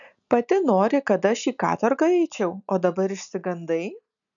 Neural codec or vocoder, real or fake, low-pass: none; real; 7.2 kHz